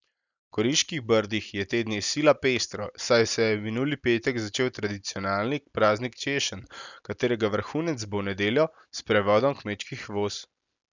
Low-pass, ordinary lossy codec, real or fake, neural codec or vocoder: 7.2 kHz; none; real; none